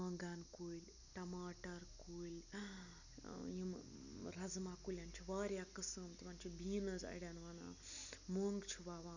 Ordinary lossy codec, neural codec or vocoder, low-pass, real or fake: none; none; 7.2 kHz; real